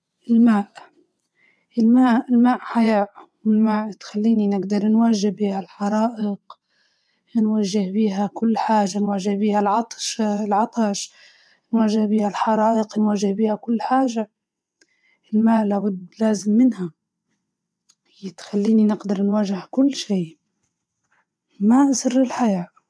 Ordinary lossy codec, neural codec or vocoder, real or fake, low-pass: none; vocoder, 22.05 kHz, 80 mel bands, WaveNeXt; fake; none